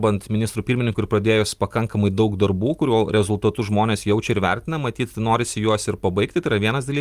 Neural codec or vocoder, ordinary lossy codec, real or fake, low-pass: none; Opus, 32 kbps; real; 14.4 kHz